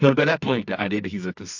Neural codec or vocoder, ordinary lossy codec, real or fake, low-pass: codec, 24 kHz, 0.9 kbps, WavTokenizer, medium music audio release; AAC, 48 kbps; fake; 7.2 kHz